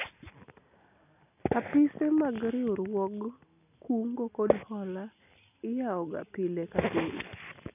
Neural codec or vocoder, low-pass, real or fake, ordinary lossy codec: none; 3.6 kHz; real; none